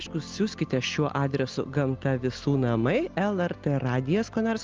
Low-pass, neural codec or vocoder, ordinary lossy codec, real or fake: 7.2 kHz; none; Opus, 32 kbps; real